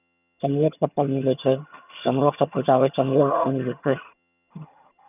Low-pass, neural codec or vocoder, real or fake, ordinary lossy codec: 3.6 kHz; vocoder, 22.05 kHz, 80 mel bands, HiFi-GAN; fake; none